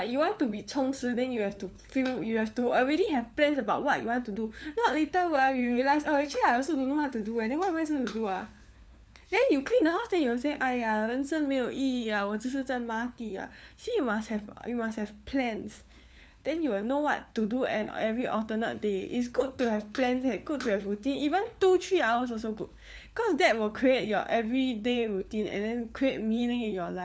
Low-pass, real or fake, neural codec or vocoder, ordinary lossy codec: none; fake; codec, 16 kHz, 4 kbps, FunCodec, trained on LibriTTS, 50 frames a second; none